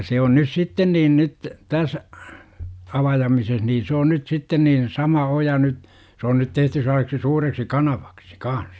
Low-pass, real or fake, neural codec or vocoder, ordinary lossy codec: none; real; none; none